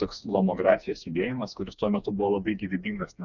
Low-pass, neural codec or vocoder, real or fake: 7.2 kHz; codec, 16 kHz, 2 kbps, FreqCodec, smaller model; fake